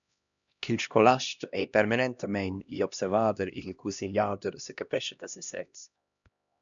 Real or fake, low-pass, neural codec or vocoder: fake; 7.2 kHz; codec, 16 kHz, 1 kbps, X-Codec, HuBERT features, trained on LibriSpeech